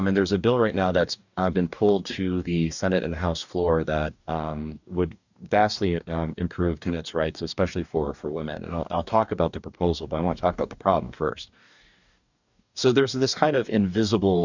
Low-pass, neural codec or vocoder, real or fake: 7.2 kHz; codec, 44.1 kHz, 2.6 kbps, DAC; fake